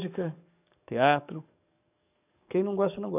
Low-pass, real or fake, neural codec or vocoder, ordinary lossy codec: 3.6 kHz; fake; codec, 44.1 kHz, 7.8 kbps, DAC; none